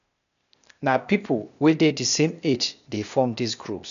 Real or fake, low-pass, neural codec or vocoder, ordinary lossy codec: fake; 7.2 kHz; codec, 16 kHz, 0.8 kbps, ZipCodec; none